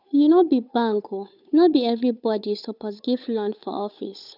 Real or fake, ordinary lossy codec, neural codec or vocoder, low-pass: fake; none; codec, 16 kHz, 8 kbps, FunCodec, trained on Chinese and English, 25 frames a second; 5.4 kHz